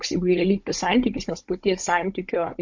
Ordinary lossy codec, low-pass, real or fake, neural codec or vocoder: MP3, 48 kbps; 7.2 kHz; fake; codec, 16 kHz, 16 kbps, FunCodec, trained on Chinese and English, 50 frames a second